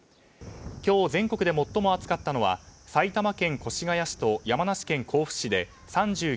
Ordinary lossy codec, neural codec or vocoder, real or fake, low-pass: none; none; real; none